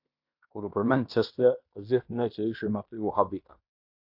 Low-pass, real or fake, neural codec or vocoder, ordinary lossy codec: 5.4 kHz; fake; codec, 16 kHz in and 24 kHz out, 0.9 kbps, LongCat-Audio-Codec, fine tuned four codebook decoder; AAC, 48 kbps